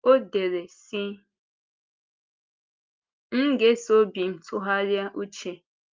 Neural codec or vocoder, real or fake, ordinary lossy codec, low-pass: none; real; Opus, 24 kbps; 7.2 kHz